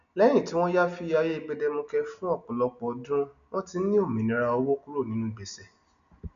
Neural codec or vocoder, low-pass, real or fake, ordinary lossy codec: none; 7.2 kHz; real; none